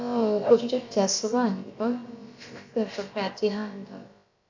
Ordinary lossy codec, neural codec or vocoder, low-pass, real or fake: MP3, 64 kbps; codec, 16 kHz, about 1 kbps, DyCAST, with the encoder's durations; 7.2 kHz; fake